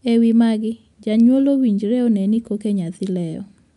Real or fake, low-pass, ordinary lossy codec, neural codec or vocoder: real; 10.8 kHz; MP3, 96 kbps; none